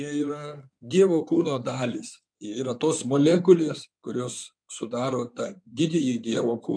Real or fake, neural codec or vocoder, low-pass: fake; codec, 16 kHz in and 24 kHz out, 2.2 kbps, FireRedTTS-2 codec; 9.9 kHz